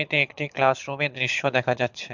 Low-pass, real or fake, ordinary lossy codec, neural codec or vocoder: 7.2 kHz; fake; MP3, 64 kbps; codec, 24 kHz, 6 kbps, HILCodec